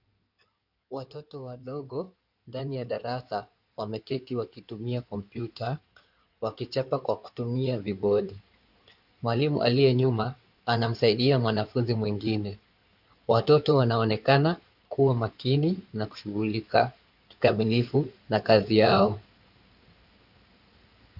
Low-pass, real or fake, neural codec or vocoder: 5.4 kHz; fake; codec, 16 kHz in and 24 kHz out, 2.2 kbps, FireRedTTS-2 codec